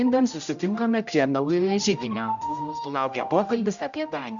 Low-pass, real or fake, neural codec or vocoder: 7.2 kHz; fake; codec, 16 kHz, 0.5 kbps, X-Codec, HuBERT features, trained on general audio